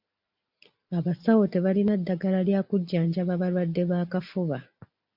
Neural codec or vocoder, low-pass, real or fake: none; 5.4 kHz; real